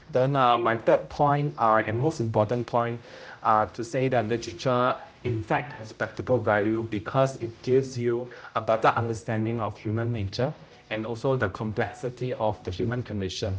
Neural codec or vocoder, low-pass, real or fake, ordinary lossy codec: codec, 16 kHz, 0.5 kbps, X-Codec, HuBERT features, trained on general audio; none; fake; none